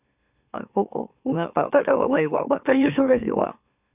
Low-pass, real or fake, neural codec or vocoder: 3.6 kHz; fake; autoencoder, 44.1 kHz, a latent of 192 numbers a frame, MeloTTS